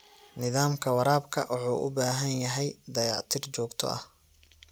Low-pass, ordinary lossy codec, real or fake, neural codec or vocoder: none; none; real; none